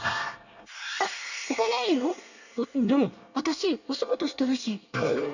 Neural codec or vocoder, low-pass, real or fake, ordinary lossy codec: codec, 24 kHz, 1 kbps, SNAC; 7.2 kHz; fake; none